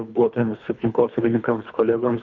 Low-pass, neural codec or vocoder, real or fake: 7.2 kHz; codec, 24 kHz, 3 kbps, HILCodec; fake